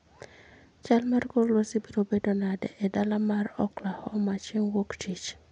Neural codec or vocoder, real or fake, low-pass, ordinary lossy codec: none; real; 9.9 kHz; none